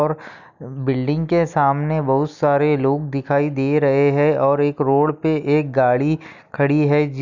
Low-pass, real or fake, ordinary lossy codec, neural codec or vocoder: 7.2 kHz; real; none; none